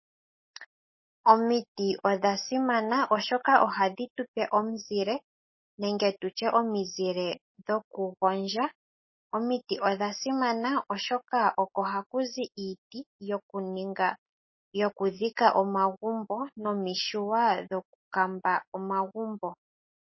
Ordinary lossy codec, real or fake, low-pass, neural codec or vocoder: MP3, 24 kbps; real; 7.2 kHz; none